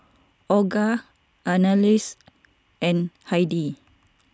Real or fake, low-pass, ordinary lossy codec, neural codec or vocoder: real; none; none; none